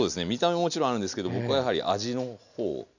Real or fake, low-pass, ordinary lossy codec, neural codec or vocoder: real; 7.2 kHz; none; none